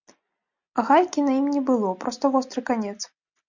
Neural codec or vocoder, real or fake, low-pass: none; real; 7.2 kHz